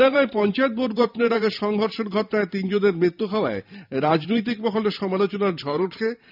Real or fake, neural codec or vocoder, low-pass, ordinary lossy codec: fake; vocoder, 44.1 kHz, 128 mel bands every 512 samples, BigVGAN v2; 5.4 kHz; none